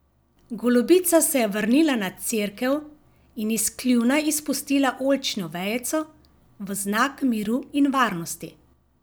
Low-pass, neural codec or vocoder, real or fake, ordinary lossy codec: none; none; real; none